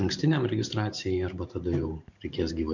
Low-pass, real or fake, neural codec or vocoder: 7.2 kHz; fake; vocoder, 44.1 kHz, 128 mel bands every 512 samples, BigVGAN v2